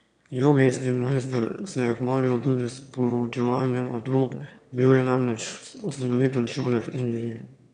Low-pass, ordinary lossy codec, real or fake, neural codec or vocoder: 9.9 kHz; none; fake; autoencoder, 22.05 kHz, a latent of 192 numbers a frame, VITS, trained on one speaker